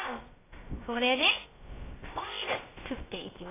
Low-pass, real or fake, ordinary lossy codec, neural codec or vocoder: 3.6 kHz; fake; AAC, 16 kbps; codec, 16 kHz, about 1 kbps, DyCAST, with the encoder's durations